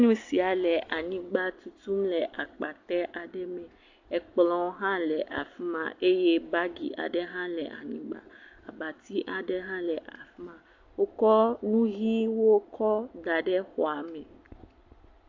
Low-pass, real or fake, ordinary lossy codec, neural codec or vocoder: 7.2 kHz; real; AAC, 48 kbps; none